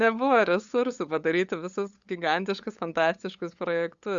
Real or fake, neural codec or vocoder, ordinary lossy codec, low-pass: fake; codec, 16 kHz, 8 kbps, FunCodec, trained on LibriTTS, 25 frames a second; Opus, 64 kbps; 7.2 kHz